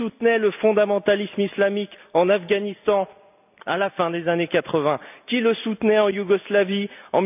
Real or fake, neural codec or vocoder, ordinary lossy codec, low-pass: real; none; none; 3.6 kHz